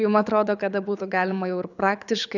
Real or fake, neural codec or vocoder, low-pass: fake; codec, 24 kHz, 6 kbps, HILCodec; 7.2 kHz